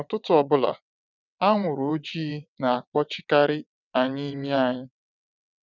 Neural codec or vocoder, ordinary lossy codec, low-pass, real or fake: vocoder, 22.05 kHz, 80 mel bands, WaveNeXt; none; 7.2 kHz; fake